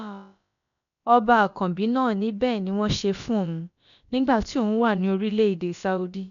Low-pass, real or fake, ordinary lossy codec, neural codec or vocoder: 7.2 kHz; fake; none; codec, 16 kHz, about 1 kbps, DyCAST, with the encoder's durations